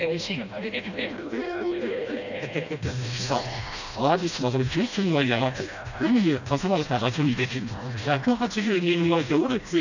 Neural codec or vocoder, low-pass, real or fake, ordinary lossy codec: codec, 16 kHz, 1 kbps, FreqCodec, smaller model; 7.2 kHz; fake; none